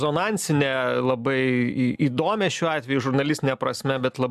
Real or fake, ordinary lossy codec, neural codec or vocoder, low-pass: real; MP3, 96 kbps; none; 14.4 kHz